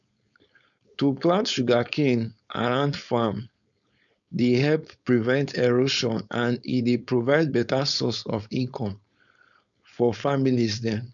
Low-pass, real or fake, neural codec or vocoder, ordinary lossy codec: 7.2 kHz; fake; codec, 16 kHz, 4.8 kbps, FACodec; none